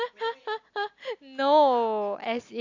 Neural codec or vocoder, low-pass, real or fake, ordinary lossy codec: none; 7.2 kHz; real; AAC, 48 kbps